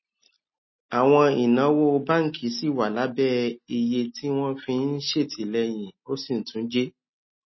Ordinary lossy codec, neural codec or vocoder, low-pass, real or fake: MP3, 24 kbps; none; 7.2 kHz; real